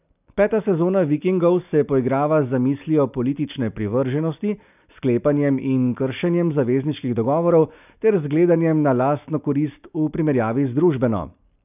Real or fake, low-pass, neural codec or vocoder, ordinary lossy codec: real; 3.6 kHz; none; none